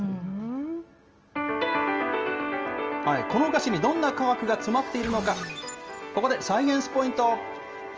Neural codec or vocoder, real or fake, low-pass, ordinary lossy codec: none; real; 7.2 kHz; Opus, 24 kbps